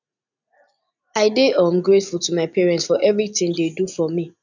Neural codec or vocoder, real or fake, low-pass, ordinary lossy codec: none; real; 7.2 kHz; none